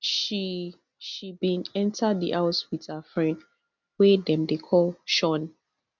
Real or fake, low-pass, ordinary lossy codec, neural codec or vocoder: real; 7.2 kHz; none; none